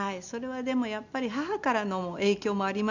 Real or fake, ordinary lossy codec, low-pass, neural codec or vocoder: real; none; 7.2 kHz; none